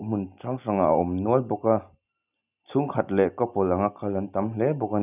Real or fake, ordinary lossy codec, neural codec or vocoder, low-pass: real; none; none; 3.6 kHz